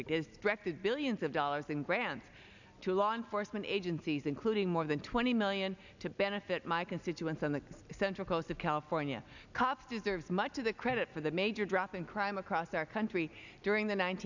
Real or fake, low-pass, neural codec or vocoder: real; 7.2 kHz; none